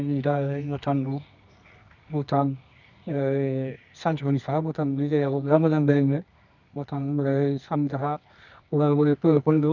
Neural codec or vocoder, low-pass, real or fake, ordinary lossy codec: codec, 24 kHz, 0.9 kbps, WavTokenizer, medium music audio release; 7.2 kHz; fake; none